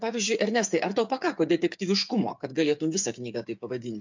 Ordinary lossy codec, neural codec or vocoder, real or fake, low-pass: MP3, 64 kbps; codec, 16 kHz, 8 kbps, FreqCodec, smaller model; fake; 7.2 kHz